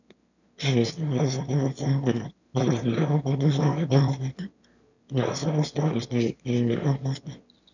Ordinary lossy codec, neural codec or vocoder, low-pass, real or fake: none; autoencoder, 22.05 kHz, a latent of 192 numbers a frame, VITS, trained on one speaker; 7.2 kHz; fake